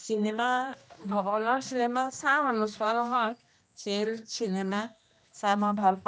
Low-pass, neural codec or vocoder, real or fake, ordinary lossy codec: none; codec, 16 kHz, 1 kbps, X-Codec, HuBERT features, trained on general audio; fake; none